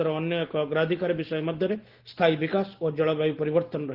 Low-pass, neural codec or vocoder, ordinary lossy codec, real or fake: 5.4 kHz; none; Opus, 16 kbps; real